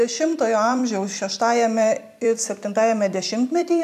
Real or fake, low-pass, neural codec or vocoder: fake; 14.4 kHz; vocoder, 44.1 kHz, 128 mel bands every 256 samples, BigVGAN v2